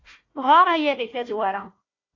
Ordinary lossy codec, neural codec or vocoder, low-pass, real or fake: AAC, 32 kbps; codec, 16 kHz, 1 kbps, FunCodec, trained on Chinese and English, 50 frames a second; 7.2 kHz; fake